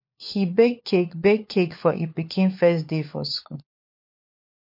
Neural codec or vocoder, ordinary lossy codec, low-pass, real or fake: codec, 16 kHz, 4 kbps, FunCodec, trained on LibriTTS, 50 frames a second; MP3, 32 kbps; 5.4 kHz; fake